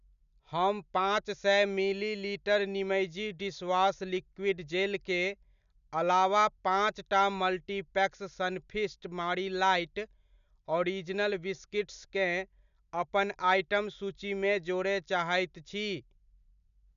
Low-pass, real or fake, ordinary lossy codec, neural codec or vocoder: 7.2 kHz; real; none; none